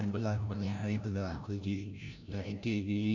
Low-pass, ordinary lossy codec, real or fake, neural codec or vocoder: 7.2 kHz; none; fake; codec, 16 kHz, 0.5 kbps, FreqCodec, larger model